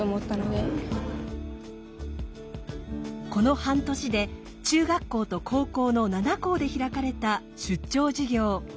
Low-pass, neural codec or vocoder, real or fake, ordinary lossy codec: none; none; real; none